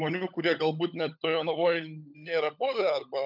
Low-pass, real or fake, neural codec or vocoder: 5.4 kHz; fake; codec, 16 kHz, 16 kbps, FunCodec, trained on LibriTTS, 50 frames a second